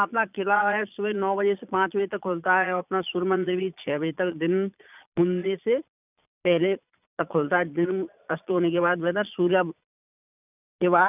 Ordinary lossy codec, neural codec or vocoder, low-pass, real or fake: none; vocoder, 22.05 kHz, 80 mel bands, Vocos; 3.6 kHz; fake